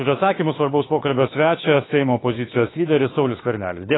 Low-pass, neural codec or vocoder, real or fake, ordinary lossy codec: 7.2 kHz; autoencoder, 48 kHz, 32 numbers a frame, DAC-VAE, trained on Japanese speech; fake; AAC, 16 kbps